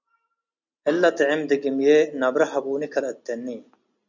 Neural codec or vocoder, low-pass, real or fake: none; 7.2 kHz; real